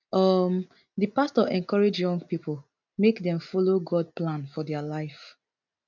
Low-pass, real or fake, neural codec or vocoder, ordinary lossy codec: 7.2 kHz; real; none; none